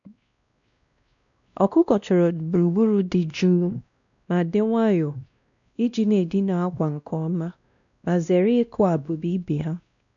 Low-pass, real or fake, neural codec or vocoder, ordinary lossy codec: 7.2 kHz; fake; codec, 16 kHz, 1 kbps, X-Codec, WavLM features, trained on Multilingual LibriSpeech; none